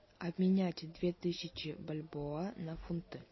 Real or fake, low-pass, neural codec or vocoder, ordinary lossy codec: real; 7.2 kHz; none; MP3, 24 kbps